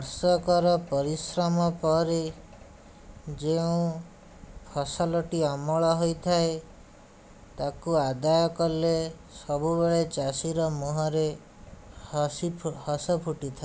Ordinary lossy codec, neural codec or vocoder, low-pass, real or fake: none; none; none; real